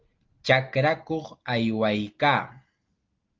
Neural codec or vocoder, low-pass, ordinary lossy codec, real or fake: none; 7.2 kHz; Opus, 32 kbps; real